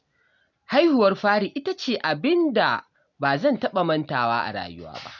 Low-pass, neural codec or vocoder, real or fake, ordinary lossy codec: 7.2 kHz; none; real; none